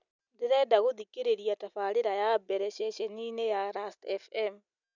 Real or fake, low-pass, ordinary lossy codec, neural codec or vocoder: real; 7.2 kHz; none; none